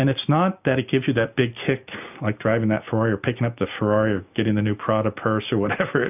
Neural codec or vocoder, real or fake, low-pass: none; real; 3.6 kHz